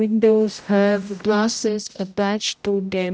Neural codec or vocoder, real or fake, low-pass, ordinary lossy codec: codec, 16 kHz, 0.5 kbps, X-Codec, HuBERT features, trained on general audio; fake; none; none